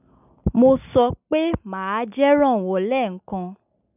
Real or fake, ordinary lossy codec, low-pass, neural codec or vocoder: real; none; 3.6 kHz; none